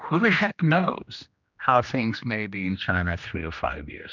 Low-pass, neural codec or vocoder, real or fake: 7.2 kHz; codec, 16 kHz, 1 kbps, X-Codec, HuBERT features, trained on general audio; fake